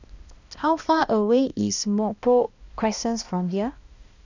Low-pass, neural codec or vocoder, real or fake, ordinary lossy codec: 7.2 kHz; codec, 16 kHz, 1 kbps, X-Codec, HuBERT features, trained on balanced general audio; fake; none